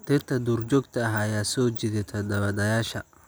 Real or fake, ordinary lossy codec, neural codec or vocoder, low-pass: real; none; none; none